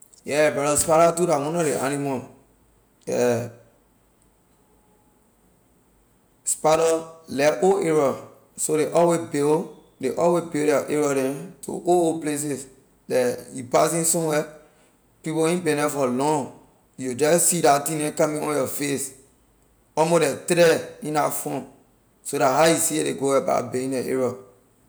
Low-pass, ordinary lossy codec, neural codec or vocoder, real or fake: none; none; none; real